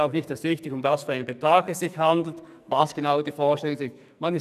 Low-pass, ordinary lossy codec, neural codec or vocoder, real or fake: 14.4 kHz; none; codec, 44.1 kHz, 2.6 kbps, SNAC; fake